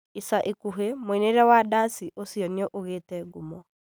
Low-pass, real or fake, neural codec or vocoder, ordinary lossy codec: none; real; none; none